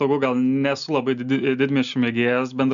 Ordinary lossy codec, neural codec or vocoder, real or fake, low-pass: AAC, 96 kbps; none; real; 7.2 kHz